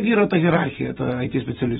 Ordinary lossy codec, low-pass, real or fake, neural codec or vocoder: AAC, 16 kbps; 19.8 kHz; fake; vocoder, 44.1 kHz, 128 mel bands every 256 samples, BigVGAN v2